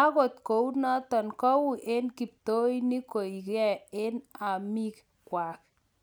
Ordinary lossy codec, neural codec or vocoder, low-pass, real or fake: none; none; none; real